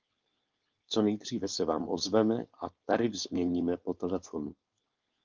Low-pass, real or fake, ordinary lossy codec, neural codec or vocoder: 7.2 kHz; fake; Opus, 24 kbps; codec, 16 kHz, 4.8 kbps, FACodec